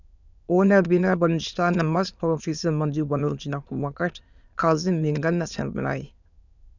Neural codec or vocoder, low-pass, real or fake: autoencoder, 22.05 kHz, a latent of 192 numbers a frame, VITS, trained on many speakers; 7.2 kHz; fake